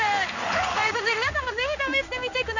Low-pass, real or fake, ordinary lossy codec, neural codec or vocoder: 7.2 kHz; fake; none; codec, 16 kHz in and 24 kHz out, 1 kbps, XY-Tokenizer